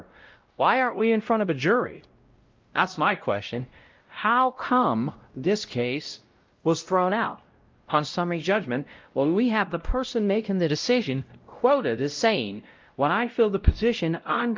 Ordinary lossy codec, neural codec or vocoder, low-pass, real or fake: Opus, 32 kbps; codec, 16 kHz, 0.5 kbps, X-Codec, WavLM features, trained on Multilingual LibriSpeech; 7.2 kHz; fake